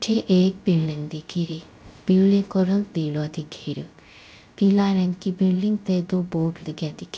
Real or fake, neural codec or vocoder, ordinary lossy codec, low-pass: fake; codec, 16 kHz, 0.3 kbps, FocalCodec; none; none